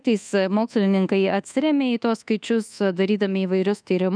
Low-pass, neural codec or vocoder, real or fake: 9.9 kHz; codec, 24 kHz, 1.2 kbps, DualCodec; fake